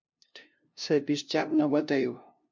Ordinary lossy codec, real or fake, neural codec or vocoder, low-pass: MP3, 48 kbps; fake; codec, 16 kHz, 0.5 kbps, FunCodec, trained on LibriTTS, 25 frames a second; 7.2 kHz